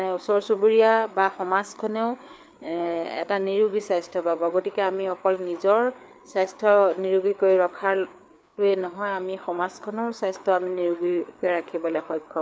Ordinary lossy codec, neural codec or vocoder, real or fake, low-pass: none; codec, 16 kHz, 4 kbps, FreqCodec, larger model; fake; none